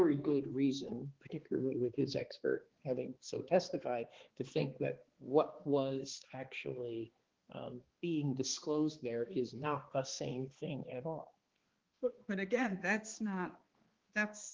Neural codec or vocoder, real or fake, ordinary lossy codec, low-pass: codec, 16 kHz, 2 kbps, X-Codec, HuBERT features, trained on balanced general audio; fake; Opus, 16 kbps; 7.2 kHz